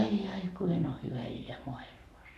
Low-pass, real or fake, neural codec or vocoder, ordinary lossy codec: 14.4 kHz; fake; codec, 44.1 kHz, 7.8 kbps, Pupu-Codec; none